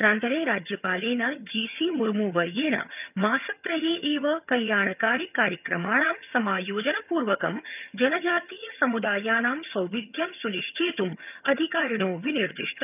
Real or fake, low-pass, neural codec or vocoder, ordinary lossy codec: fake; 3.6 kHz; vocoder, 22.05 kHz, 80 mel bands, HiFi-GAN; none